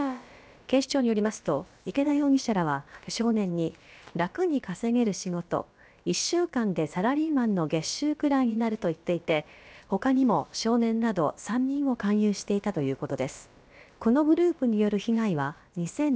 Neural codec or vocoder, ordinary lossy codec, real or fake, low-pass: codec, 16 kHz, about 1 kbps, DyCAST, with the encoder's durations; none; fake; none